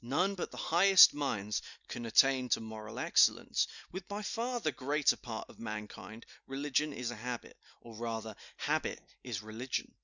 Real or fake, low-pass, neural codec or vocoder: real; 7.2 kHz; none